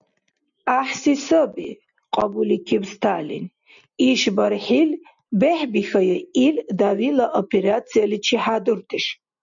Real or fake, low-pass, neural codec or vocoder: real; 7.2 kHz; none